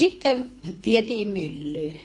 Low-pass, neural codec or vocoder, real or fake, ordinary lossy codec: 10.8 kHz; codec, 24 kHz, 3 kbps, HILCodec; fake; MP3, 48 kbps